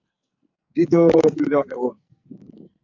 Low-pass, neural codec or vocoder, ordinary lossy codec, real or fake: 7.2 kHz; codec, 44.1 kHz, 2.6 kbps, SNAC; AAC, 48 kbps; fake